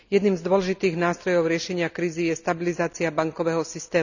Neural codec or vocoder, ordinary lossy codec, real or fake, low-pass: none; none; real; 7.2 kHz